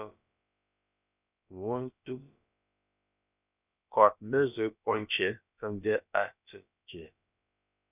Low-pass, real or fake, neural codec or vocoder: 3.6 kHz; fake; codec, 16 kHz, about 1 kbps, DyCAST, with the encoder's durations